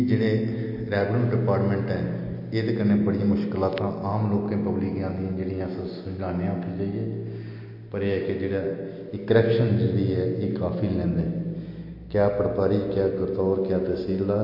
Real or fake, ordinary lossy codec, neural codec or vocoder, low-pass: real; MP3, 32 kbps; none; 5.4 kHz